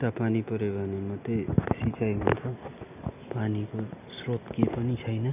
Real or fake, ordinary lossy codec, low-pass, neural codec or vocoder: real; none; 3.6 kHz; none